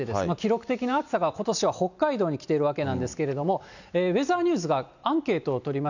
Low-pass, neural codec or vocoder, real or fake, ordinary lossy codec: 7.2 kHz; none; real; none